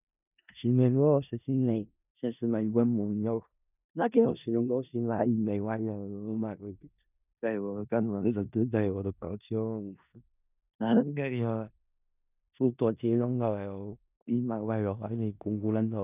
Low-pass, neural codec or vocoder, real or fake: 3.6 kHz; codec, 16 kHz in and 24 kHz out, 0.4 kbps, LongCat-Audio-Codec, four codebook decoder; fake